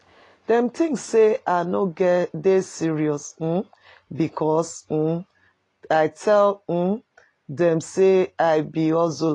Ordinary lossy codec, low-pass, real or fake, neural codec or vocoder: AAC, 32 kbps; 10.8 kHz; real; none